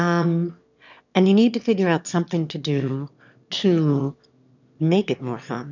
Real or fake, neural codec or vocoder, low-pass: fake; autoencoder, 22.05 kHz, a latent of 192 numbers a frame, VITS, trained on one speaker; 7.2 kHz